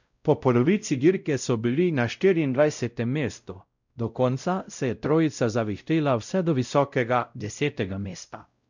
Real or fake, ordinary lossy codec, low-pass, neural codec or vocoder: fake; none; 7.2 kHz; codec, 16 kHz, 0.5 kbps, X-Codec, WavLM features, trained on Multilingual LibriSpeech